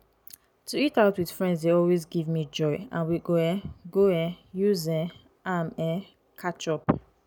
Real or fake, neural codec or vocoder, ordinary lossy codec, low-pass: real; none; none; none